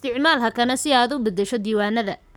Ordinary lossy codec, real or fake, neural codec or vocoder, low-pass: none; fake; codec, 44.1 kHz, 7.8 kbps, Pupu-Codec; none